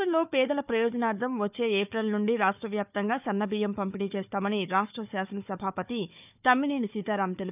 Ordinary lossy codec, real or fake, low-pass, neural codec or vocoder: none; fake; 3.6 kHz; codec, 16 kHz, 4 kbps, FunCodec, trained on Chinese and English, 50 frames a second